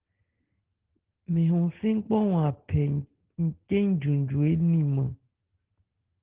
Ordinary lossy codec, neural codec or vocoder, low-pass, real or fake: Opus, 16 kbps; none; 3.6 kHz; real